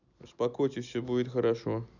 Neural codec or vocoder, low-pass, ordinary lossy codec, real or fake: none; 7.2 kHz; none; real